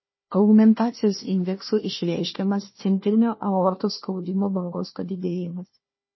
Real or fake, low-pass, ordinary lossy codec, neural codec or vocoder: fake; 7.2 kHz; MP3, 24 kbps; codec, 16 kHz, 1 kbps, FunCodec, trained on Chinese and English, 50 frames a second